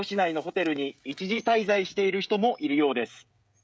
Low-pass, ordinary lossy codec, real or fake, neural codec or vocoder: none; none; fake; codec, 16 kHz, 16 kbps, FreqCodec, smaller model